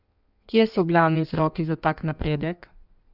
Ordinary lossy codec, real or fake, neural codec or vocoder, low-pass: none; fake; codec, 16 kHz in and 24 kHz out, 1.1 kbps, FireRedTTS-2 codec; 5.4 kHz